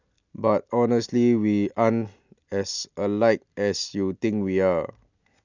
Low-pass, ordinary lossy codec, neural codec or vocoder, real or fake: 7.2 kHz; none; none; real